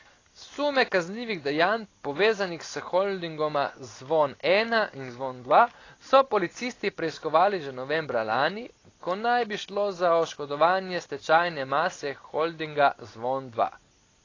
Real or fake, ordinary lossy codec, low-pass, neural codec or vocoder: real; AAC, 32 kbps; 7.2 kHz; none